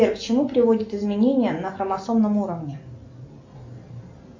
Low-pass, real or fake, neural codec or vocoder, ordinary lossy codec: 7.2 kHz; real; none; MP3, 64 kbps